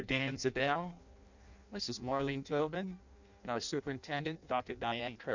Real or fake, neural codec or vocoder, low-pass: fake; codec, 16 kHz in and 24 kHz out, 0.6 kbps, FireRedTTS-2 codec; 7.2 kHz